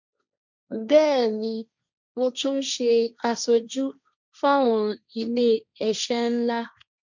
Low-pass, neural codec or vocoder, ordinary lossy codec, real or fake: 7.2 kHz; codec, 16 kHz, 1.1 kbps, Voila-Tokenizer; none; fake